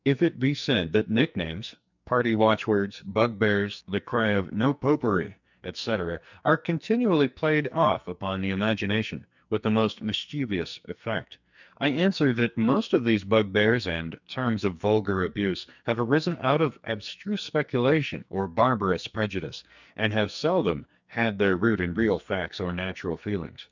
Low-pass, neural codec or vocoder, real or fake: 7.2 kHz; codec, 44.1 kHz, 2.6 kbps, SNAC; fake